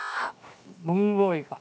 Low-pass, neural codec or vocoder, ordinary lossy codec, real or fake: none; codec, 16 kHz, 0.7 kbps, FocalCodec; none; fake